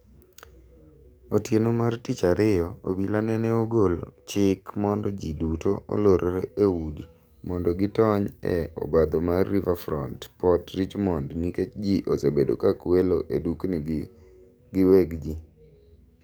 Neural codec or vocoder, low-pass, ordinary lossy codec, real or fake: codec, 44.1 kHz, 7.8 kbps, DAC; none; none; fake